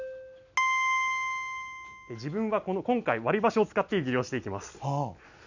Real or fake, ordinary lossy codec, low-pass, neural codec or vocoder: real; none; 7.2 kHz; none